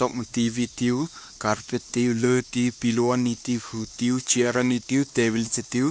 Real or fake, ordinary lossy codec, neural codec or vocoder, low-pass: fake; none; codec, 16 kHz, 2 kbps, X-Codec, WavLM features, trained on Multilingual LibriSpeech; none